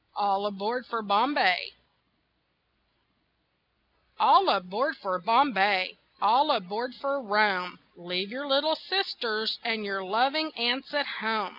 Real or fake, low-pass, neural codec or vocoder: real; 5.4 kHz; none